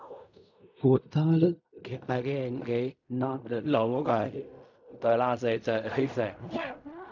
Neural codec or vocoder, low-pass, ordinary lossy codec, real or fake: codec, 16 kHz in and 24 kHz out, 0.4 kbps, LongCat-Audio-Codec, fine tuned four codebook decoder; 7.2 kHz; none; fake